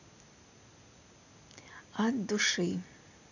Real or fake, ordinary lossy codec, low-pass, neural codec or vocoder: real; none; 7.2 kHz; none